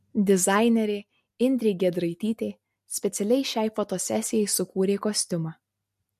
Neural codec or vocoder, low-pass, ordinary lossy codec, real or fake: none; 14.4 kHz; MP3, 64 kbps; real